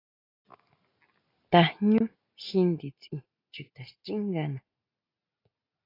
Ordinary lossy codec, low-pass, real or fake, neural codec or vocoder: AAC, 32 kbps; 5.4 kHz; real; none